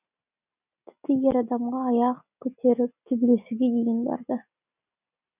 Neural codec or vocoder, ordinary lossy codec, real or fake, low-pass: none; none; real; 3.6 kHz